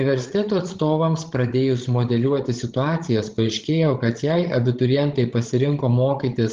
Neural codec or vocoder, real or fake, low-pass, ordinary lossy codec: codec, 16 kHz, 16 kbps, FreqCodec, larger model; fake; 7.2 kHz; Opus, 16 kbps